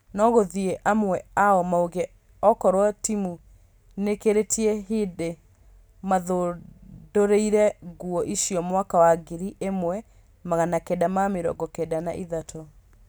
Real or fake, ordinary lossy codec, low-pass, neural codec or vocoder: real; none; none; none